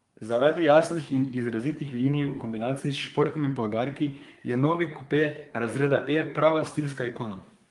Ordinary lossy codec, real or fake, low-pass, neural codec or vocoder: Opus, 32 kbps; fake; 10.8 kHz; codec, 24 kHz, 1 kbps, SNAC